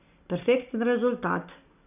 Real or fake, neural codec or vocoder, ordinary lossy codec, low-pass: real; none; none; 3.6 kHz